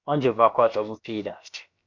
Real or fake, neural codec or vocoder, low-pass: fake; codec, 16 kHz, about 1 kbps, DyCAST, with the encoder's durations; 7.2 kHz